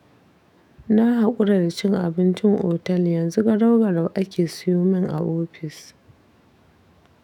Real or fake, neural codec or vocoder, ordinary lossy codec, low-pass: fake; autoencoder, 48 kHz, 128 numbers a frame, DAC-VAE, trained on Japanese speech; none; 19.8 kHz